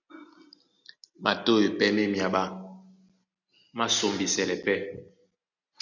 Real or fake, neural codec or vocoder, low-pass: real; none; 7.2 kHz